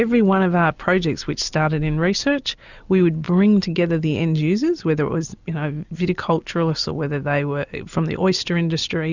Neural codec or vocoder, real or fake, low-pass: none; real; 7.2 kHz